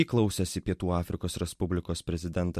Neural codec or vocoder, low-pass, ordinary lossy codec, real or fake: none; 14.4 kHz; MP3, 64 kbps; real